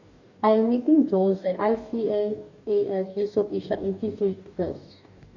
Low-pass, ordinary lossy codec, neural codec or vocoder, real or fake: 7.2 kHz; none; codec, 44.1 kHz, 2.6 kbps, DAC; fake